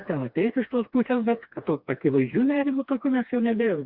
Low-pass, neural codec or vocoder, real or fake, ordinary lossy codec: 5.4 kHz; codec, 16 kHz, 2 kbps, FreqCodec, smaller model; fake; Opus, 64 kbps